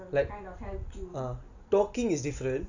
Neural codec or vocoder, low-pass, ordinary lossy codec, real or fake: none; 7.2 kHz; none; real